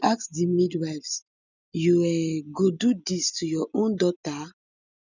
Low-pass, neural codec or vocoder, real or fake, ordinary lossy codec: 7.2 kHz; none; real; none